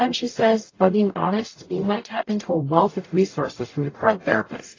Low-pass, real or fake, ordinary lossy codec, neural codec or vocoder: 7.2 kHz; fake; AAC, 32 kbps; codec, 44.1 kHz, 0.9 kbps, DAC